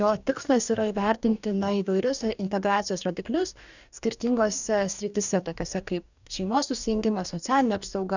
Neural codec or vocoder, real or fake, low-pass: codec, 44.1 kHz, 2.6 kbps, DAC; fake; 7.2 kHz